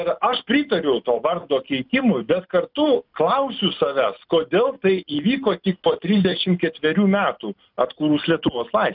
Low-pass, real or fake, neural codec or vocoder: 5.4 kHz; real; none